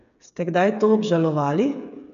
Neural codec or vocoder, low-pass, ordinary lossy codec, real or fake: codec, 16 kHz, 8 kbps, FreqCodec, smaller model; 7.2 kHz; none; fake